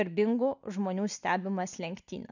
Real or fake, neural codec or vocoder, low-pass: real; none; 7.2 kHz